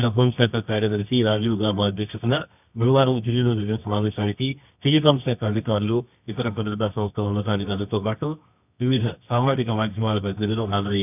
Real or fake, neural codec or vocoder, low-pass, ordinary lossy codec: fake; codec, 24 kHz, 0.9 kbps, WavTokenizer, medium music audio release; 3.6 kHz; none